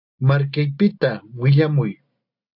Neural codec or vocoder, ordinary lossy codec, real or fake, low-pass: none; AAC, 48 kbps; real; 5.4 kHz